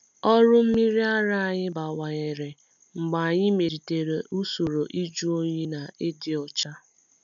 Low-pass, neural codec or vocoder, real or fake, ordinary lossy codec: 7.2 kHz; none; real; none